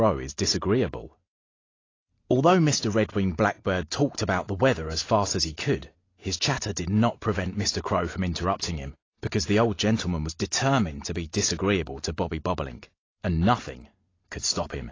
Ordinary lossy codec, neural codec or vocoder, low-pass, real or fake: AAC, 32 kbps; none; 7.2 kHz; real